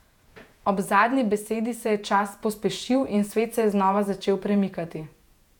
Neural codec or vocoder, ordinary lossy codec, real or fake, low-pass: vocoder, 48 kHz, 128 mel bands, Vocos; none; fake; 19.8 kHz